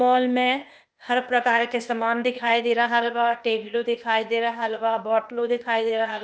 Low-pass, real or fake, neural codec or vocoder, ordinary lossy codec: none; fake; codec, 16 kHz, 0.8 kbps, ZipCodec; none